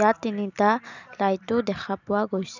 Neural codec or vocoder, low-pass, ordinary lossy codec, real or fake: none; 7.2 kHz; none; real